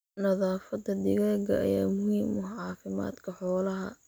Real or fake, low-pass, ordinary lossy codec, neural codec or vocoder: real; none; none; none